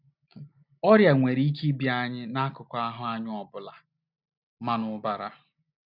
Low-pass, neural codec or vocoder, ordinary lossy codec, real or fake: 5.4 kHz; none; none; real